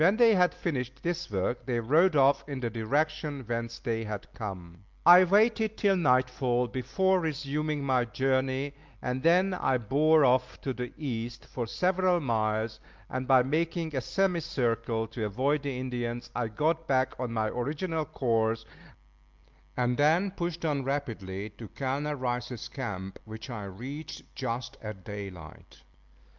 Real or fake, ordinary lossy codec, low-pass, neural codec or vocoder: real; Opus, 24 kbps; 7.2 kHz; none